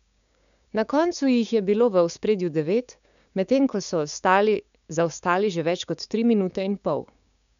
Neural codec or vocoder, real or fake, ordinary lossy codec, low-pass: codec, 16 kHz, 6 kbps, DAC; fake; none; 7.2 kHz